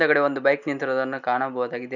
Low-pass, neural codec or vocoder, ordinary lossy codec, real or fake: 7.2 kHz; none; none; real